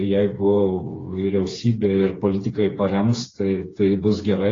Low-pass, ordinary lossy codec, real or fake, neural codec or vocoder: 7.2 kHz; AAC, 32 kbps; fake; codec, 16 kHz, 4 kbps, FreqCodec, smaller model